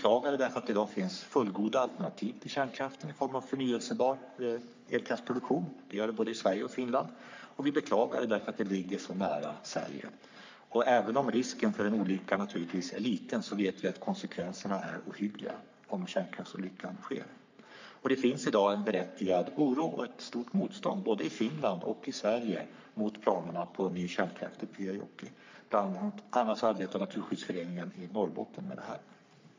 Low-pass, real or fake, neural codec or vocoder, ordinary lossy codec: 7.2 kHz; fake; codec, 44.1 kHz, 3.4 kbps, Pupu-Codec; MP3, 64 kbps